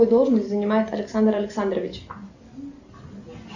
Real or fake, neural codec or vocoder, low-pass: real; none; 7.2 kHz